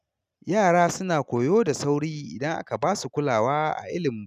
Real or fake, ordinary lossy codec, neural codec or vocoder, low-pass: real; none; none; 14.4 kHz